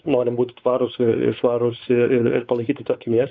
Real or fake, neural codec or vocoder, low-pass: fake; codec, 16 kHz, 4 kbps, X-Codec, WavLM features, trained on Multilingual LibriSpeech; 7.2 kHz